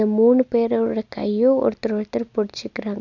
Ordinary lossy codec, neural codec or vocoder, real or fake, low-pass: none; none; real; 7.2 kHz